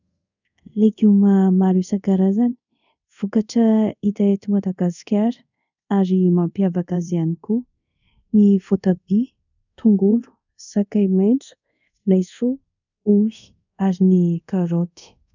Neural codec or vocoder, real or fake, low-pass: codec, 24 kHz, 0.5 kbps, DualCodec; fake; 7.2 kHz